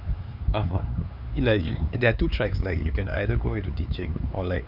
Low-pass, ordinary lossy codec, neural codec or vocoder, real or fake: 5.4 kHz; none; codec, 16 kHz, 4 kbps, X-Codec, HuBERT features, trained on LibriSpeech; fake